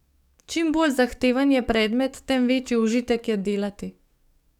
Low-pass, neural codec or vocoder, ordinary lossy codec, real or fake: 19.8 kHz; codec, 44.1 kHz, 7.8 kbps, DAC; none; fake